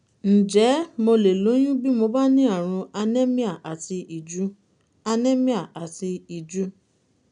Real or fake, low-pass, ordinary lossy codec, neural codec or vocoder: real; 9.9 kHz; none; none